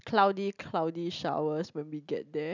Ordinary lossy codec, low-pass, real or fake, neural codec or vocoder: none; 7.2 kHz; real; none